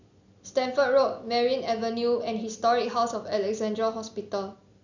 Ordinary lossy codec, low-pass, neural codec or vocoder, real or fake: none; 7.2 kHz; none; real